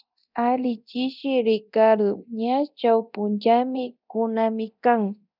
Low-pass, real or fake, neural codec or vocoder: 5.4 kHz; fake; codec, 24 kHz, 0.9 kbps, DualCodec